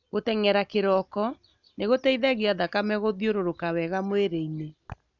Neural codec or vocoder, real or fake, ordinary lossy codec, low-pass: none; real; none; 7.2 kHz